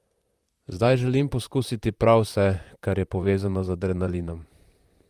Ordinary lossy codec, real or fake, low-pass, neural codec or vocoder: Opus, 32 kbps; fake; 14.4 kHz; vocoder, 44.1 kHz, 128 mel bands, Pupu-Vocoder